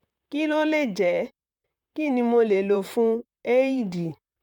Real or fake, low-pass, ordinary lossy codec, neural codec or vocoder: fake; 19.8 kHz; none; vocoder, 44.1 kHz, 128 mel bands, Pupu-Vocoder